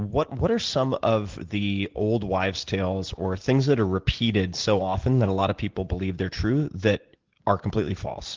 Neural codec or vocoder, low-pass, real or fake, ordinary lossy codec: none; 7.2 kHz; real; Opus, 16 kbps